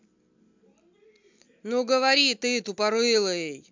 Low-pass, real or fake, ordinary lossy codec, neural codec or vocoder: 7.2 kHz; real; MP3, 64 kbps; none